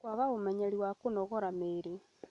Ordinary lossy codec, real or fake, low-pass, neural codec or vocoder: MP3, 64 kbps; real; 10.8 kHz; none